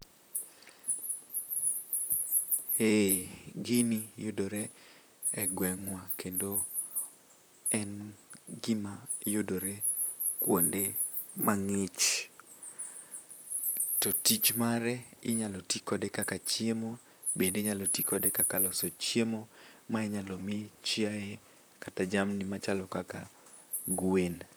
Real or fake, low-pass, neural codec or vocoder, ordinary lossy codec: fake; none; vocoder, 44.1 kHz, 128 mel bands, Pupu-Vocoder; none